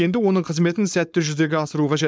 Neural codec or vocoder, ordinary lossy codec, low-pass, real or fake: codec, 16 kHz, 4.8 kbps, FACodec; none; none; fake